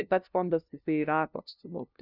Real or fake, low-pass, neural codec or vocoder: fake; 5.4 kHz; codec, 16 kHz, 0.5 kbps, FunCodec, trained on LibriTTS, 25 frames a second